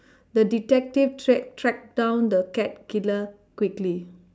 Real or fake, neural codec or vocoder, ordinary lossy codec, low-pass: real; none; none; none